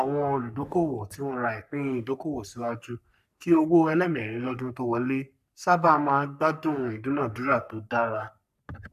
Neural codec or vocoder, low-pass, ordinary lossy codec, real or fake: codec, 44.1 kHz, 3.4 kbps, Pupu-Codec; 14.4 kHz; none; fake